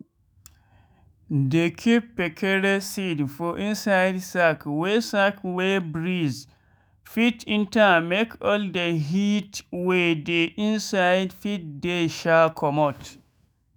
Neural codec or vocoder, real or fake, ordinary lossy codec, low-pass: autoencoder, 48 kHz, 128 numbers a frame, DAC-VAE, trained on Japanese speech; fake; none; none